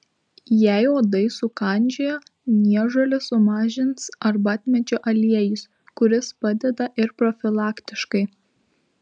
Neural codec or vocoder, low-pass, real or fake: none; 9.9 kHz; real